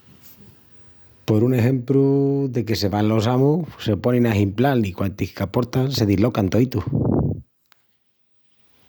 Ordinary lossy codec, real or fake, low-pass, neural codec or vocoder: none; real; none; none